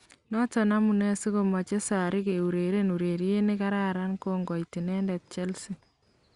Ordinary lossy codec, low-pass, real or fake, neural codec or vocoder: Opus, 64 kbps; 10.8 kHz; real; none